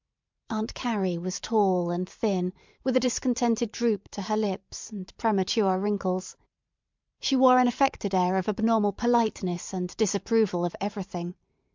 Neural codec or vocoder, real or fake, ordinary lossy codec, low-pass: vocoder, 44.1 kHz, 128 mel bands every 512 samples, BigVGAN v2; fake; MP3, 64 kbps; 7.2 kHz